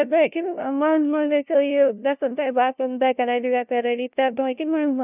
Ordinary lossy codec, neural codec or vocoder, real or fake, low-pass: none; codec, 16 kHz, 0.5 kbps, FunCodec, trained on LibriTTS, 25 frames a second; fake; 3.6 kHz